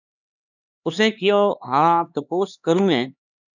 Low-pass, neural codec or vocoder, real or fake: 7.2 kHz; codec, 16 kHz, 2 kbps, X-Codec, HuBERT features, trained on LibriSpeech; fake